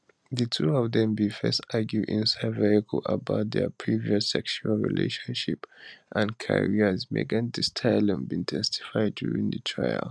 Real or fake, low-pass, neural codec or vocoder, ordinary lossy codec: real; none; none; none